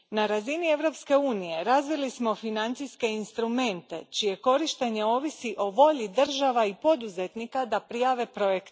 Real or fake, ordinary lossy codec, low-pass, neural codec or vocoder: real; none; none; none